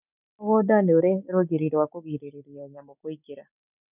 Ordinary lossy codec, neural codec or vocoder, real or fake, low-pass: none; none; real; 3.6 kHz